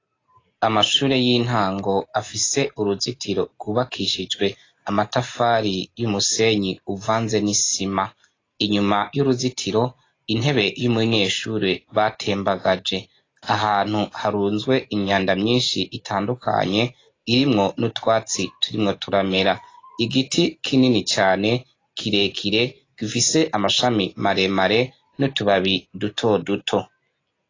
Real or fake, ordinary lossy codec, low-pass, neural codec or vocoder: real; AAC, 32 kbps; 7.2 kHz; none